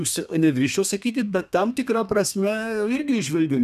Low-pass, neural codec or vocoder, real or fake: 14.4 kHz; codec, 32 kHz, 1.9 kbps, SNAC; fake